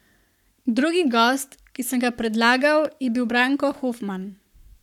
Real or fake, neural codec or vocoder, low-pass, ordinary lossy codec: fake; codec, 44.1 kHz, 7.8 kbps, Pupu-Codec; 19.8 kHz; none